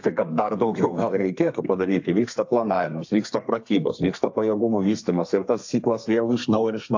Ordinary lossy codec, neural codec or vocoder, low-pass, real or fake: AAC, 48 kbps; codec, 32 kHz, 1.9 kbps, SNAC; 7.2 kHz; fake